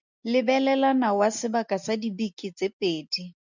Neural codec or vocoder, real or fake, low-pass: none; real; 7.2 kHz